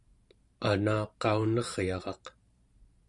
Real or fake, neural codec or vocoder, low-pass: fake; vocoder, 44.1 kHz, 128 mel bands every 512 samples, BigVGAN v2; 10.8 kHz